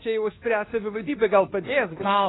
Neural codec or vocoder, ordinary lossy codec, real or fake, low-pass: codec, 24 kHz, 1.2 kbps, DualCodec; AAC, 16 kbps; fake; 7.2 kHz